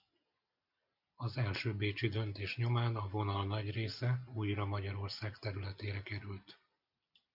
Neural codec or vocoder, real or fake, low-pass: none; real; 5.4 kHz